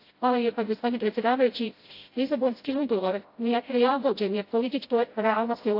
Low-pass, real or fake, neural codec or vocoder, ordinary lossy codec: 5.4 kHz; fake; codec, 16 kHz, 0.5 kbps, FreqCodec, smaller model; AAC, 32 kbps